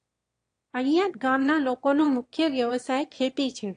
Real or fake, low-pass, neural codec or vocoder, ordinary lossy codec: fake; 9.9 kHz; autoencoder, 22.05 kHz, a latent of 192 numbers a frame, VITS, trained on one speaker; AAC, 48 kbps